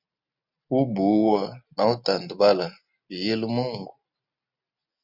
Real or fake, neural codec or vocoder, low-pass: real; none; 5.4 kHz